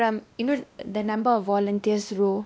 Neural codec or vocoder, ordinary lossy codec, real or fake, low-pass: codec, 16 kHz, 1 kbps, X-Codec, WavLM features, trained on Multilingual LibriSpeech; none; fake; none